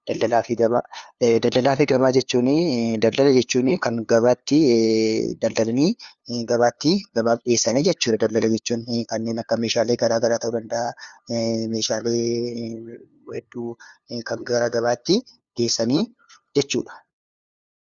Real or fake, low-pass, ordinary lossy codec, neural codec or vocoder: fake; 7.2 kHz; Opus, 64 kbps; codec, 16 kHz, 2 kbps, FunCodec, trained on LibriTTS, 25 frames a second